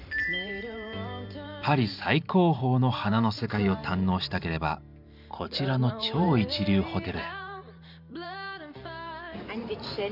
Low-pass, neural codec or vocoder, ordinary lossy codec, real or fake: 5.4 kHz; vocoder, 44.1 kHz, 128 mel bands every 512 samples, BigVGAN v2; none; fake